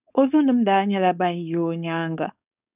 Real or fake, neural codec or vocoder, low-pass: fake; codec, 16 kHz, 4.8 kbps, FACodec; 3.6 kHz